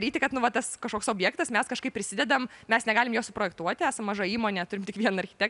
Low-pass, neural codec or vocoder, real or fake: 10.8 kHz; none; real